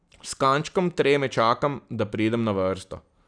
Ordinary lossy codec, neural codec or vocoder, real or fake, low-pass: none; none; real; 9.9 kHz